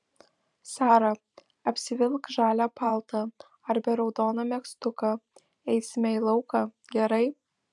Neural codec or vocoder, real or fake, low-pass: vocoder, 44.1 kHz, 128 mel bands every 512 samples, BigVGAN v2; fake; 10.8 kHz